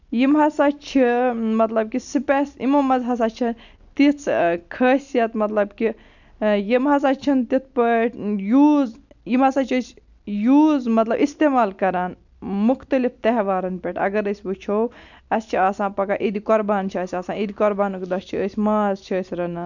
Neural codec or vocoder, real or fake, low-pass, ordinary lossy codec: none; real; 7.2 kHz; none